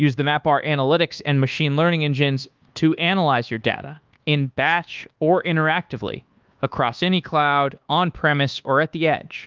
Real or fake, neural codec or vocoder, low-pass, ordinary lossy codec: fake; codec, 24 kHz, 1.2 kbps, DualCodec; 7.2 kHz; Opus, 32 kbps